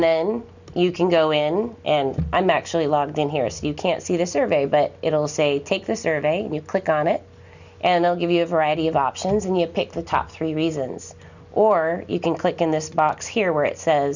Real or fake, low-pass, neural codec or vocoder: real; 7.2 kHz; none